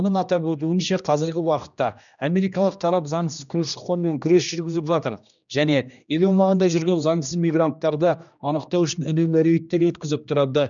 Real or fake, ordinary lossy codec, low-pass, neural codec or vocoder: fake; none; 7.2 kHz; codec, 16 kHz, 1 kbps, X-Codec, HuBERT features, trained on general audio